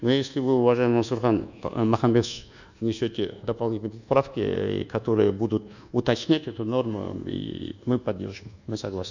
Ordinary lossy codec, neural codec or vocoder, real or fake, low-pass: none; codec, 24 kHz, 1.2 kbps, DualCodec; fake; 7.2 kHz